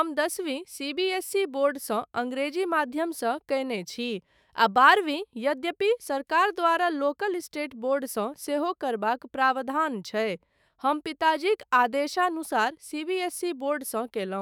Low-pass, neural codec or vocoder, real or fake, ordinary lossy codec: none; autoencoder, 48 kHz, 128 numbers a frame, DAC-VAE, trained on Japanese speech; fake; none